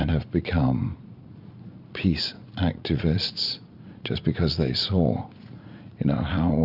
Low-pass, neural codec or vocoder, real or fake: 5.4 kHz; vocoder, 44.1 kHz, 80 mel bands, Vocos; fake